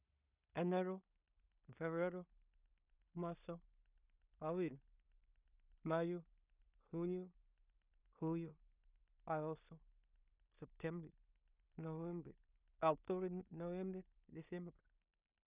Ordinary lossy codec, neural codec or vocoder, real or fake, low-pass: none; codec, 16 kHz in and 24 kHz out, 0.4 kbps, LongCat-Audio-Codec, two codebook decoder; fake; 3.6 kHz